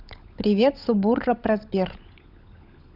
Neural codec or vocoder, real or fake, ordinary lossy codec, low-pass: codec, 16 kHz, 16 kbps, FunCodec, trained on LibriTTS, 50 frames a second; fake; none; 5.4 kHz